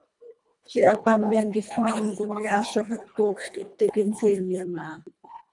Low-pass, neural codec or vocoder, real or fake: 10.8 kHz; codec, 24 kHz, 1.5 kbps, HILCodec; fake